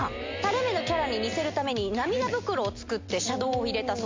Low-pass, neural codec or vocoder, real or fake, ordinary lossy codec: 7.2 kHz; none; real; MP3, 48 kbps